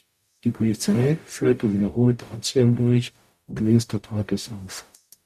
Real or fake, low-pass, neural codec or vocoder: fake; 14.4 kHz; codec, 44.1 kHz, 0.9 kbps, DAC